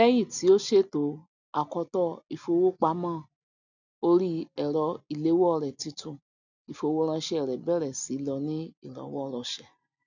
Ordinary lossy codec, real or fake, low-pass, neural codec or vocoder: none; real; 7.2 kHz; none